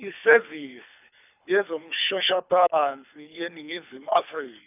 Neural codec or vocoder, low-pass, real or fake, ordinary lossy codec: codec, 24 kHz, 3 kbps, HILCodec; 3.6 kHz; fake; none